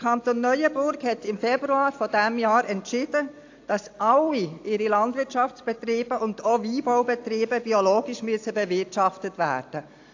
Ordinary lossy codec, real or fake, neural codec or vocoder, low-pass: AAC, 48 kbps; real; none; 7.2 kHz